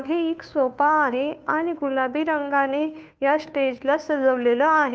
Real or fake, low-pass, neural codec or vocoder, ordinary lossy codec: fake; none; codec, 16 kHz, 2 kbps, FunCodec, trained on Chinese and English, 25 frames a second; none